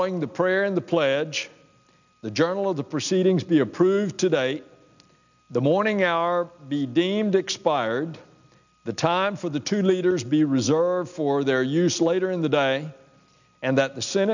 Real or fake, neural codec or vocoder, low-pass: real; none; 7.2 kHz